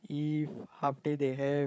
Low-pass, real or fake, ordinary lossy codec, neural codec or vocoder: none; fake; none; codec, 16 kHz, 16 kbps, FreqCodec, larger model